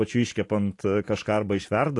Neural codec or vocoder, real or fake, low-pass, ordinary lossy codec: none; real; 10.8 kHz; AAC, 48 kbps